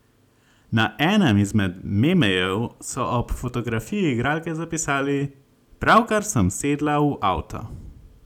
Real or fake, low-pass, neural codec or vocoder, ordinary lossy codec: real; 19.8 kHz; none; none